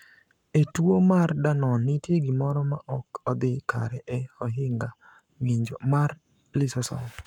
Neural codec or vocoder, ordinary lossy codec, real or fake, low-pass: codec, 44.1 kHz, 7.8 kbps, Pupu-Codec; none; fake; 19.8 kHz